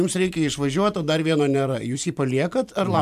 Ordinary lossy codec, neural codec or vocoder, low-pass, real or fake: MP3, 96 kbps; vocoder, 44.1 kHz, 128 mel bands every 512 samples, BigVGAN v2; 14.4 kHz; fake